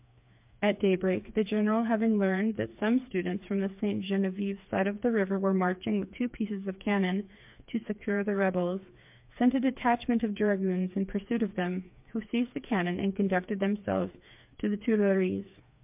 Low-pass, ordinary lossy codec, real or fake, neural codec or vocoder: 3.6 kHz; MP3, 32 kbps; fake; codec, 16 kHz, 4 kbps, FreqCodec, smaller model